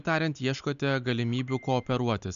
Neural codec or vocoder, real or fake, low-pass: none; real; 7.2 kHz